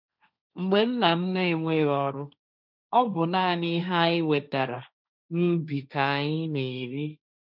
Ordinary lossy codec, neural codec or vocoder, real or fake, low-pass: none; codec, 16 kHz, 1.1 kbps, Voila-Tokenizer; fake; 5.4 kHz